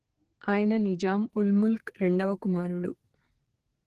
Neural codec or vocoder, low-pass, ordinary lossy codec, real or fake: codec, 44.1 kHz, 2.6 kbps, SNAC; 14.4 kHz; Opus, 16 kbps; fake